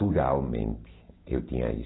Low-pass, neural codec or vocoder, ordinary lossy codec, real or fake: 7.2 kHz; none; AAC, 16 kbps; real